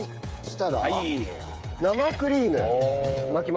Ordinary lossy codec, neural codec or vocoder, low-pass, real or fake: none; codec, 16 kHz, 8 kbps, FreqCodec, smaller model; none; fake